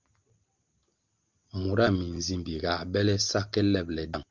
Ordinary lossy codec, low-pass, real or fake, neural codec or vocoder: Opus, 32 kbps; 7.2 kHz; real; none